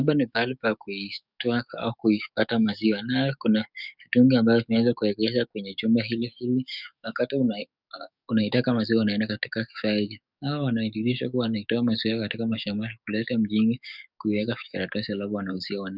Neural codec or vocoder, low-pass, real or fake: codec, 44.1 kHz, 7.8 kbps, DAC; 5.4 kHz; fake